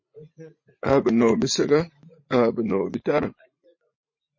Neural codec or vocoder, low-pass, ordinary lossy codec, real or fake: vocoder, 44.1 kHz, 80 mel bands, Vocos; 7.2 kHz; MP3, 32 kbps; fake